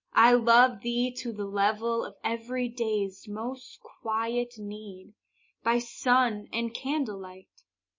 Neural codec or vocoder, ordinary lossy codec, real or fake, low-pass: none; MP3, 32 kbps; real; 7.2 kHz